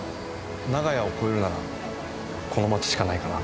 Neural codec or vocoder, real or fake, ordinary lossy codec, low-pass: none; real; none; none